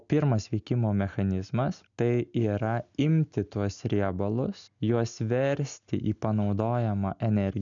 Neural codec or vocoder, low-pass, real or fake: none; 7.2 kHz; real